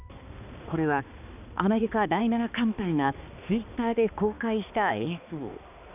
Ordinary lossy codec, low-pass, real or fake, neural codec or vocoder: none; 3.6 kHz; fake; codec, 16 kHz, 2 kbps, X-Codec, HuBERT features, trained on balanced general audio